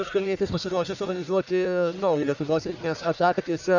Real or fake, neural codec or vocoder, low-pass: fake; codec, 44.1 kHz, 1.7 kbps, Pupu-Codec; 7.2 kHz